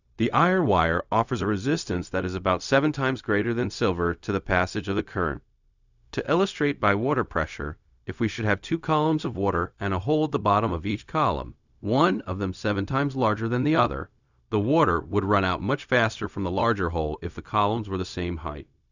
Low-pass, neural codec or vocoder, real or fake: 7.2 kHz; codec, 16 kHz, 0.4 kbps, LongCat-Audio-Codec; fake